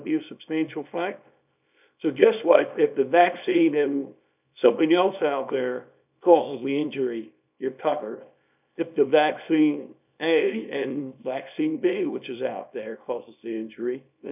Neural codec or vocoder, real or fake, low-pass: codec, 24 kHz, 0.9 kbps, WavTokenizer, small release; fake; 3.6 kHz